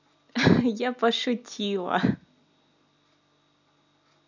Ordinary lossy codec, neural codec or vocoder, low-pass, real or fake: none; none; 7.2 kHz; real